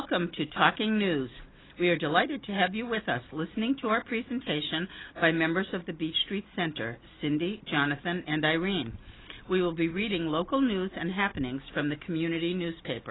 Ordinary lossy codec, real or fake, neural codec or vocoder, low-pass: AAC, 16 kbps; real; none; 7.2 kHz